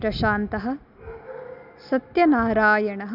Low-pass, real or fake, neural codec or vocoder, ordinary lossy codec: 5.4 kHz; real; none; none